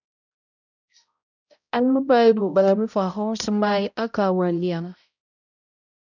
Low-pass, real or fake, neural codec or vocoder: 7.2 kHz; fake; codec, 16 kHz, 0.5 kbps, X-Codec, HuBERT features, trained on balanced general audio